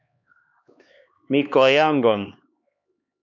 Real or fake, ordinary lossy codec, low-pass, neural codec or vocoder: fake; MP3, 64 kbps; 7.2 kHz; codec, 16 kHz, 2 kbps, X-Codec, HuBERT features, trained on LibriSpeech